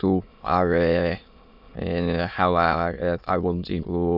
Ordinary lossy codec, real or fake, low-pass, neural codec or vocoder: none; fake; 5.4 kHz; autoencoder, 22.05 kHz, a latent of 192 numbers a frame, VITS, trained on many speakers